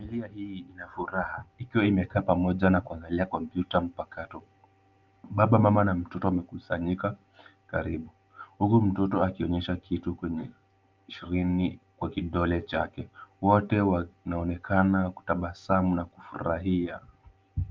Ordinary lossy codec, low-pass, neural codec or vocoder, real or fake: Opus, 32 kbps; 7.2 kHz; none; real